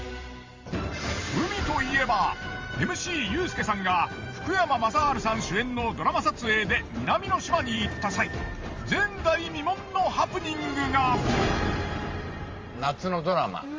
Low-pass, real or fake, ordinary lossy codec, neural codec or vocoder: 7.2 kHz; fake; Opus, 32 kbps; vocoder, 44.1 kHz, 128 mel bands every 512 samples, BigVGAN v2